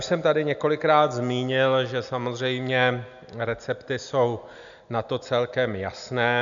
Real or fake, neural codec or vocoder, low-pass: real; none; 7.2 kHz